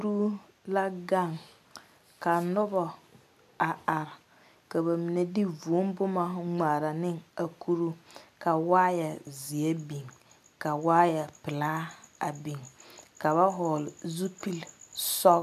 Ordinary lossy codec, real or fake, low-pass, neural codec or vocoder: AAC, 96 kbps; real; 14.4 kHz; none